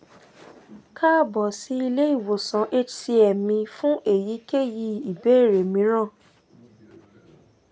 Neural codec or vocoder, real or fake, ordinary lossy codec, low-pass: none; real; none; none